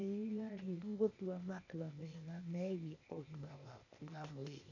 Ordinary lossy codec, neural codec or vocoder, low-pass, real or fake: none; codec, 16 kHz, 0.8 kbps, ZipCodec; 7.2 kHz; fake